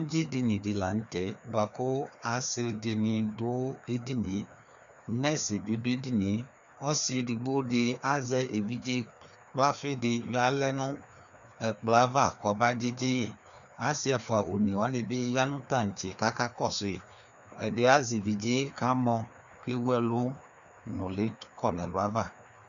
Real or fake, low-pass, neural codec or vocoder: fake; 7.2 kHz; codec, 16 kHz, 2 kbps, FreqCodec, larger model